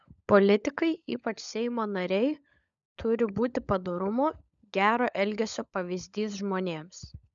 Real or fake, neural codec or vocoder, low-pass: fake; codec, 16 kHz, 16 kbps, FunCodec, trained on LibriTTS, 50 frames a second; 7.2 kHz